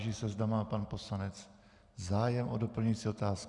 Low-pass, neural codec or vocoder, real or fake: 10.8 kHz; none; real